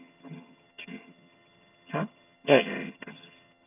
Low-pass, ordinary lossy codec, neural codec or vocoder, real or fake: 3.6 kHz; none; vocoder, 22.05 kHz, 80 mel bands, HiFi-GAN; fake